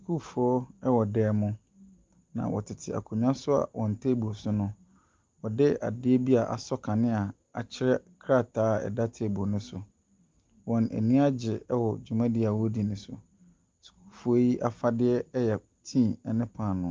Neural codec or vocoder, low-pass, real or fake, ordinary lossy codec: none; 7.2 kHz; real; Opus, 24 kbps